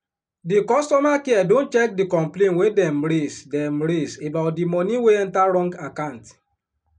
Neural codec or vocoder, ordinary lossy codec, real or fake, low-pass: none; MP3, 96 kbps; real; 9.9 kHz